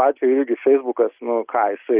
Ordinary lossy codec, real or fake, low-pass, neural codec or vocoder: Opus, 32 kbps; real; 3.6 kHz; none